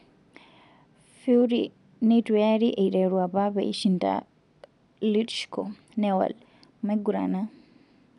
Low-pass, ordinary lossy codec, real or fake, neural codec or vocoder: 10.8 kHz; none; real; none